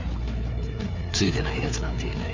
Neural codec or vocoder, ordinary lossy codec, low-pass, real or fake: codec, 16 kHz, 4 kbps, FreqCodec, larger model; MP3, 64 kbps; 7.2 kHz; fake